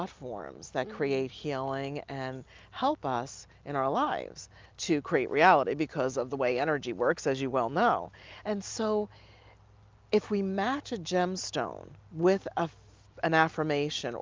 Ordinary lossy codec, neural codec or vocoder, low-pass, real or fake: Opus, 32 kbps; none; 7.2 kHz; real